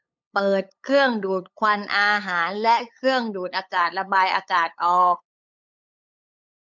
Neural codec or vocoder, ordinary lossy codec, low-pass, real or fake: codec, 16 kHz, 8 kbps, FunCodec, trained on LibriTTS, 25 frames a second; MP3, 64 kbps; 7.2 kHz; fake